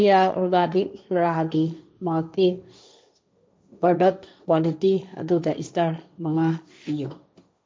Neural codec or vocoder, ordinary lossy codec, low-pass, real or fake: codec, 16 kHz, 1.1 kbps, Voila-Tokenizer; none; none; fake